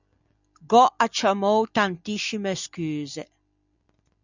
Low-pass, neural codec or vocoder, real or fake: 7.2 kHz; none; real